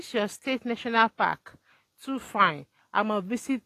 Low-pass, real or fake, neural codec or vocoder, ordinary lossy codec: 14.4 kHz; real; none; AAC, 48 kbps